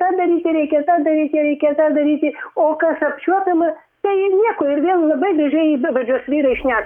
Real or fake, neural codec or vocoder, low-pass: fake; codec, 44.1 kHz, 7.8 kbps, DAC; 19.8 kHz